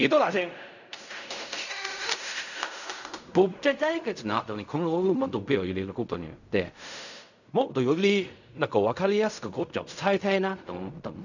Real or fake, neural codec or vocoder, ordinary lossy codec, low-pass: fake; codec, 16 kHz in and 24 kHz out, 0.4 kbps, LongCat-Audio-Codec, fine tuned four codebook decoder; none; 7.2 kHz